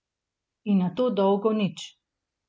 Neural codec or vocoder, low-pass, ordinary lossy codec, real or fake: none; none; none; real